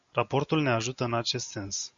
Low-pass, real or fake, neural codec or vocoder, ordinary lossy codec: 7.2 kHz; real; none; Opus, 64 kbps